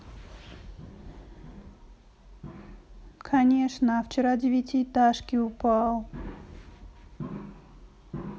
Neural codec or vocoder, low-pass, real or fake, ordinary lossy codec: none; none; real; none